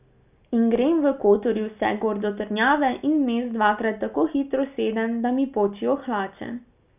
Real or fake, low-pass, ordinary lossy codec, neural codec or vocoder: real; 3.6 kHz; none; none